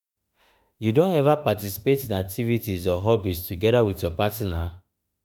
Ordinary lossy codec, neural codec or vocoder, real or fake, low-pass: none; autoencoder, 48 kHz, 32 numbers a frame, DAC-VAE, trained on Japanese speech; fake; none